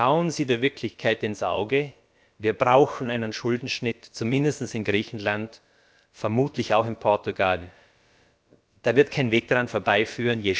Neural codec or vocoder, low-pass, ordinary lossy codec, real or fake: codec, 16 kHz, about 1 kbps, DyCAST, with the encoder's durations; none; none; fake